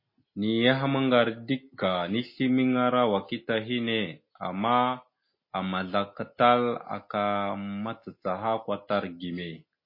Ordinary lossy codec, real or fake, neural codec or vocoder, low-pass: MP3, 24 kbps; real; none; 5.4 kHz